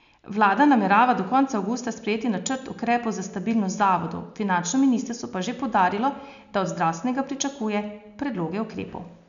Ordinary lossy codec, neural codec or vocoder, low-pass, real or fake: none; none; 7.2 kHz; real